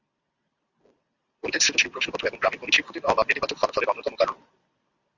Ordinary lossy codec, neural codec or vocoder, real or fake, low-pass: Opus, 64 kbps; none; real; 7.2 kHz